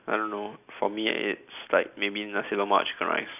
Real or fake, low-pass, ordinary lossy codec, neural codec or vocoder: real; 3.6 kHz; none; none